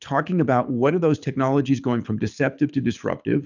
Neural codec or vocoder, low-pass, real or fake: codec, 24 kHz, 6 kbps, HILCodec; 7.2 kHz; fake